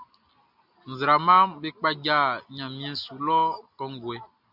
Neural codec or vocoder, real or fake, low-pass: none; real; 5.4 kHz